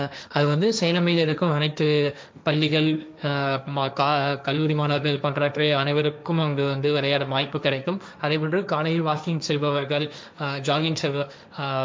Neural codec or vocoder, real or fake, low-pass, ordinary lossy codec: codec, 16 kHz, 1.1 kbps, Voila-Tokenizer; fake; none; none